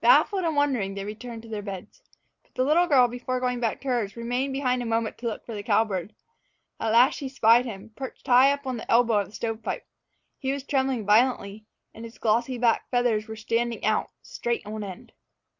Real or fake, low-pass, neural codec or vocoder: real; 7.2 kHz; none